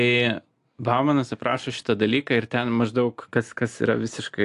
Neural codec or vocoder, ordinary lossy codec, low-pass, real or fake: none; AAC, 64 kbps; 10.8 kHz; real